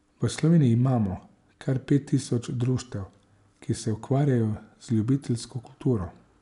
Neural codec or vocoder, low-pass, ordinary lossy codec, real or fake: none; 10.8 kHz; none; real